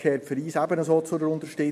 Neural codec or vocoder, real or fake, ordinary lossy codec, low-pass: none; real; MP3, 64 kbps; 14.4 kHz